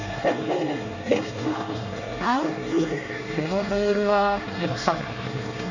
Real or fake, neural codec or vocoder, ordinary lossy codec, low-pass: fake; codec, 24 kHz, 1 kbps, SNAC; none; 7.2 kHz